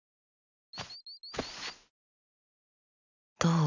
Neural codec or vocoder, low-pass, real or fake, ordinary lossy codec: none; 7.2 kHz; real; none